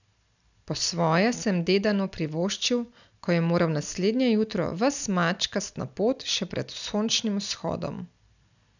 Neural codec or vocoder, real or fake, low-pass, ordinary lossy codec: none; real; 7.2 kHz; none